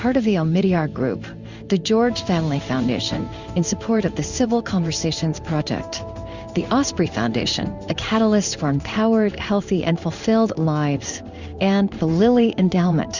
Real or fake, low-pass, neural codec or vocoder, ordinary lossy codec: fake; 7.2 kHz; codec, 16 kHz in and 24 kHz out, 1 kbps, XY-Tokenizer; Opus, 64 kbps